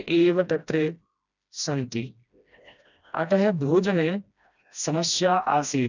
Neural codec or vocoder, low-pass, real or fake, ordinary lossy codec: codec, 16 kHz, 1 kbps, FreqCodec, smaller model; 7.2 kHz; fake; none